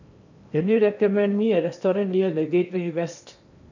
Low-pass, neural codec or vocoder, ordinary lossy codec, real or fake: 7.2 kHz; codec, 16 kHz in and 24 kHz out, 0.8 kbps, FocalCodec, streaming, 65536 codes; none; fake